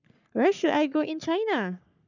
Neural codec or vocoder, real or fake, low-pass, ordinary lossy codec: codec, 44.1 kHz, 3.4 kbps, Pupu-Codec; fake; 7.2 kHz; none